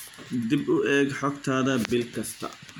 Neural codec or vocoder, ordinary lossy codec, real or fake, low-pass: none; none; real; none